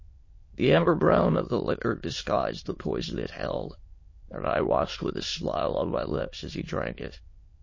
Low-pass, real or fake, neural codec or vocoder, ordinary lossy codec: 7.2 kHz; fake; autoencoder, 22.05 kHz, a latent of 192 numbers a frame, VITS, trained on many speakers; MP3, 32 kbps